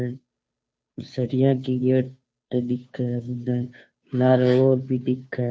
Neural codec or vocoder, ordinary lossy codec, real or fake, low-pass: codec, 16 kHz, 2 kbps, FunCodec, trained on Chinese and English, 25 frames a second; none; fake; none